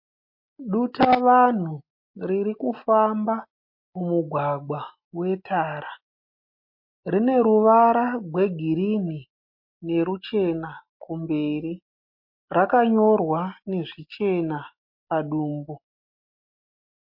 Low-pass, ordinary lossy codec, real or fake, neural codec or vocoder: 5.4 kHz; MP3, 32 kbps; real; none